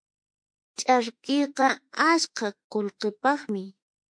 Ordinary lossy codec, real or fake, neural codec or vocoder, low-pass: MP3, 64 kbps; fake; autoencoder, 48 kHz, 32 numbers a frame, DAC-VAE, trained on Japanese speech; 9.9 kHz